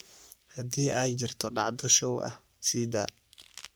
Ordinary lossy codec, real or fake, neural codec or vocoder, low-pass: none; fake; codec, 44.1 kHz, 3.4 kbps, Pupu-Codec; none